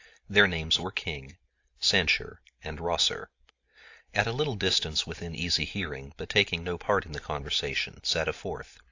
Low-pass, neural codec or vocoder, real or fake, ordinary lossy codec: 7.2 kHz; none; real; AAC, 48 kbps